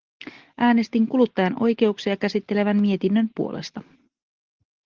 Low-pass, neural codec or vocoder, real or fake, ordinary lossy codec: 7.2 kHz; none; real; Opus, 16 kbps